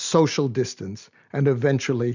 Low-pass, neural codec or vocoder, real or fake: 7.2 kHz; none; real